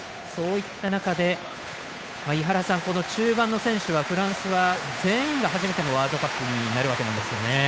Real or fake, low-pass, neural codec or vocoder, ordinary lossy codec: fake; none; codec, 16 kHz, 8 kbps, FunCodec, trained on Chinese and English, 25 frames a second; none